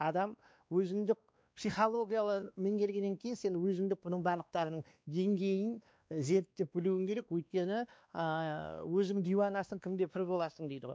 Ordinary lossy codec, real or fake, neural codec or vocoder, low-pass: none; fake; codec, 16 kHz, 2 kbps, X-Codec, WavLM features, trained on Multilingual LibriSpeech; none